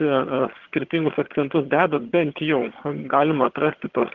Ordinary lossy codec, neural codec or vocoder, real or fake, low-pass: Opus, 16 kbps; vocoder, 22.05 kHz, 80 mel bands, HiFi-GAN; fake; 7.2 kHz